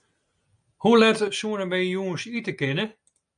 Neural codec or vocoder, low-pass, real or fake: none; 9.9 kHz; real